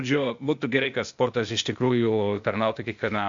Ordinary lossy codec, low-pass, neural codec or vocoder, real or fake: MP3, 64 kbps; 7.2 kHz; codec, 16 kHz, 0.8 kbps, ZipCodec; fake